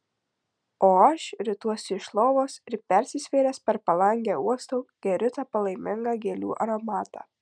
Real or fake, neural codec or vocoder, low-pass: real; none; 9.9 kHz